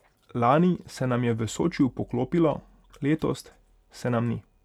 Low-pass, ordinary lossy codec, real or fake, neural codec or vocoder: 19.8 kHz; none; real; none